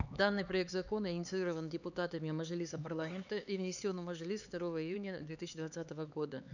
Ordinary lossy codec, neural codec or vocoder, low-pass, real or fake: none; codec, 16 kHz, 4 kbps, X-Codec, HuBERT features, trained on LibriSpeech; 7.2 kHz; fake